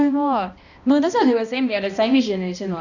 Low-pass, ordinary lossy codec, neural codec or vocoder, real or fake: 7.2 kHz; none; codec, 16 kHz, 1 kbps, X-Codec, HuBERT features, trained on balanced general audio; fake